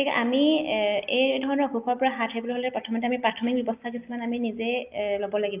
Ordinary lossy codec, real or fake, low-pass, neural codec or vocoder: Opus, 24 kbps; real; 3.6 kHz; none